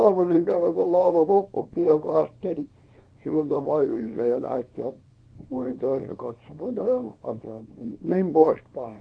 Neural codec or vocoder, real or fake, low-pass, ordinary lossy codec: codec, 24 kHz, 0.9 kbps, WavTokenizer, small release; fake; 9.9 kHz; none